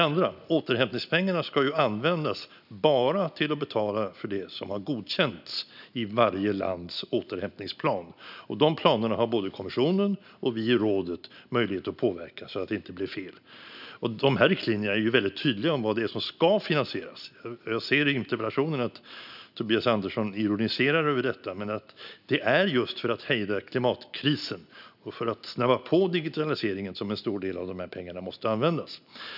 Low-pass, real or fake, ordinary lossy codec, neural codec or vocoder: 5.4 kHz; real; none; none